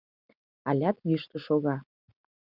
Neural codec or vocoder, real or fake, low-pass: none; real; 5.4 kHz